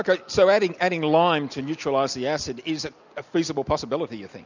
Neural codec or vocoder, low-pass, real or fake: none; 7.2 kHz; real